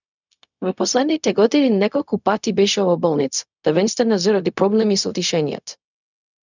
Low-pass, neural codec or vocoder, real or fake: 7.2 kHz; codec, 16 kHz, 0.4 kbps, LongCat-Audio-Codec; fake